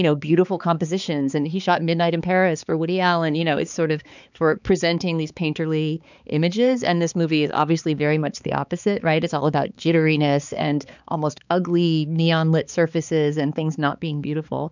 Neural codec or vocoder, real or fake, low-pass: codec, 16 kHz, 4 kbps, X-Codec, HuBERT features, trained on balanced general audio; fake; 7.2 kHz